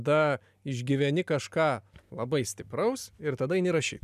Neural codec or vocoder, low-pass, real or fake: none; 14.4 kHz; real